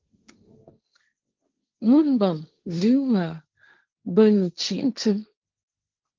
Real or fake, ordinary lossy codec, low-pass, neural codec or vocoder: fake; Opus, 32 kbps; 7.2 kHz; codec, 16 kHz, 1.1 kbps, Voila-Tokenizer